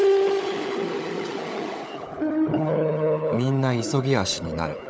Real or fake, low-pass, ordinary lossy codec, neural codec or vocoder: fake; none; none; codec, 16 kHz, 16 kbps, FunCodec, trained on LibriTTS, 50 frames a second